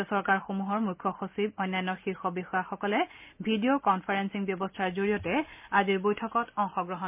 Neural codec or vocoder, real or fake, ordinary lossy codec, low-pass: none; real; MP3, 32 kbps; 3.6 kHz